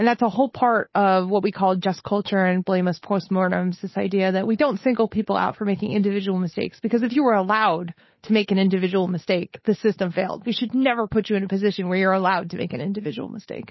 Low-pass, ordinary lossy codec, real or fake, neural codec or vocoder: 7.2 kHz; MP3, 24 kbps; fake; codec, 16 kHz, 8 kbps, FunCodec, trained on Chinese and English, 25 frames a second